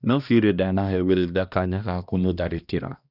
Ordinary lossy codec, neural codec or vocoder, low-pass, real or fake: none; codec, 16 kHz, 1 kbps, X-Codec, HuBERT features, trained on balanced general audio; 5.4 kHz; fake